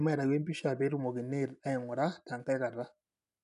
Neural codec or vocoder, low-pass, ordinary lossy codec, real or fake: none; 10.8 kHz; none; real